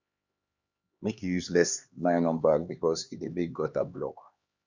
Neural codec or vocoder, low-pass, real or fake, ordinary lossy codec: codec, 16 kHz, 2 kbps, X-Codec, HuBERT features, trained on LibriSpeech; 7.2 kHz; fake; Opus, 64 kbps